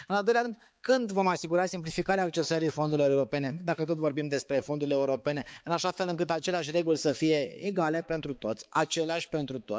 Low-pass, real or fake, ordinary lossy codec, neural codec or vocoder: none; fake; none; codec, 16 kHz, 4 kbps, X-Codec, HuBERT features, trained on balanced general audio